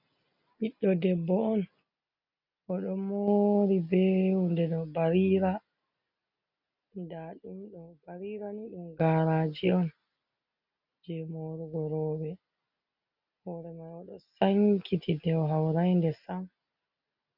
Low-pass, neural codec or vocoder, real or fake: 5.4 kHz; none; real